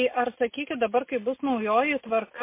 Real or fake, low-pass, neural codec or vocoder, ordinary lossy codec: real; 3.6 kHz; none; MP3, 24 kbps